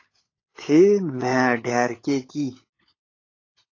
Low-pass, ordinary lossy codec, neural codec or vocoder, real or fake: 7.2 kHz; AAC, 32 kbps; codec, 16 kHz, 16 kbps, FunCodec, trained on LibriTTS, 50 frames a second; fake